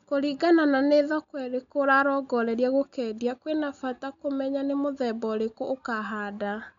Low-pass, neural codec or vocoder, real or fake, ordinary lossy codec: 7.2 kHz; none; real; none